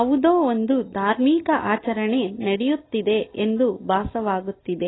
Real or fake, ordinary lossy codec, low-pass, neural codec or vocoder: real; AAC, 16 kbps; 7.2 kHz; none